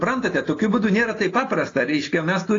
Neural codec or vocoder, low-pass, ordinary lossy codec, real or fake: none; 7.2 kHz; AAC, 32 kbps; real